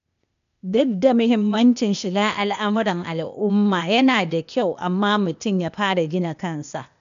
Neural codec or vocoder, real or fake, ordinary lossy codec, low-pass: codec, 16 kHz, 0.8 kbps, ZipCodec; fake; none; 7.2 kHz